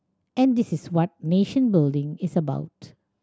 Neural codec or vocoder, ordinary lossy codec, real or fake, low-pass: none; none; real; none